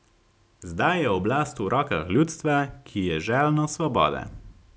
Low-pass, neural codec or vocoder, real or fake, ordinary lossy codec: none; none; real; none